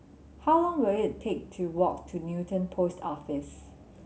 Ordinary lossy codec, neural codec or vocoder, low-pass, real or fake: none; none; none; real